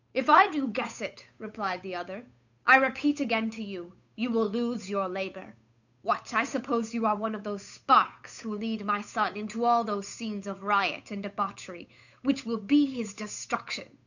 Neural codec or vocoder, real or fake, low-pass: codec, 16 kHz, 8 kbps, FunCodec, trained on Chinese and English, 25 frames a second; fake; 7.2 kHz